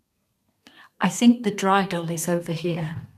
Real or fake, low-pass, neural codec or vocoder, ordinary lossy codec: fake; 14.4 kHz; codec, 32 kHz, 1.9 kbps, SNAC; none